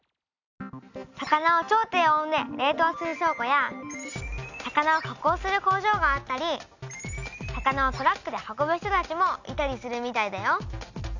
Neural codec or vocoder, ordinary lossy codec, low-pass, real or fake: none; none; 7.2 kHz; real